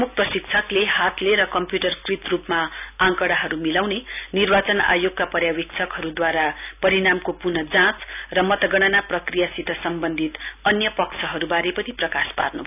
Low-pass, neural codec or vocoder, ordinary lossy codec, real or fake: 3.6 kHz; none; none; real